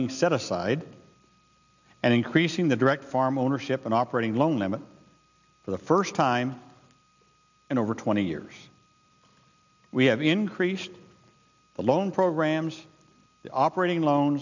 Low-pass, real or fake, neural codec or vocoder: 7.2 kHz; real; none